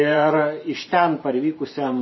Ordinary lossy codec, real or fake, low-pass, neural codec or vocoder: MP3, 24 kbps; fake; 7.2 kHz; vocoder, 44.1 kHz, 128 mel bands every 512 samples, BigVGAN v2